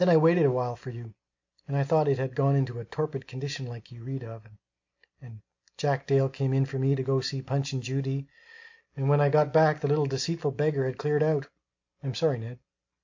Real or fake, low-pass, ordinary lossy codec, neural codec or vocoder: real; 7.2 kHz; MP3, 48 kbps; none